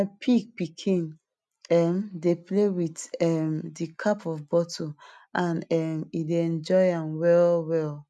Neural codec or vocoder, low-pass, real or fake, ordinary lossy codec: none; none; real; none